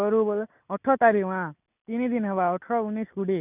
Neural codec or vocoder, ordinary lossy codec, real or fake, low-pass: none; none; real; 3.6 kHz